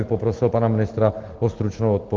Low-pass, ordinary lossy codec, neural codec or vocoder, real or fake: 7.2 kHz; Opus, 16 kbps; none; real